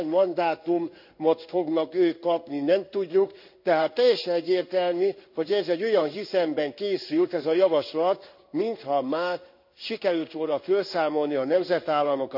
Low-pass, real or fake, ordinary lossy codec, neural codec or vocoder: 5.4 kHz; fake; none; codec, 16 kHz in and 24 kHz out, 1 kbps, XY-Tokenizer